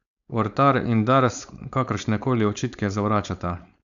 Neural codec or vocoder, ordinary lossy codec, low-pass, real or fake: codec, 16 kHz, 4.8 kbps, FACodec; none; 7.2 kHz; fake